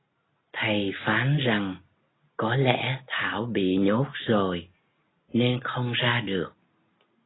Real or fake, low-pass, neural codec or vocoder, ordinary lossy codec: real; 7.2 kHz; none; AAC, 16 kbps